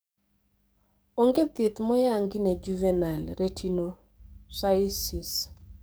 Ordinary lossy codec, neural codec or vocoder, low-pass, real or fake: none; codec, 44.1 kHz, 7.8 kbps, DAC; none; fake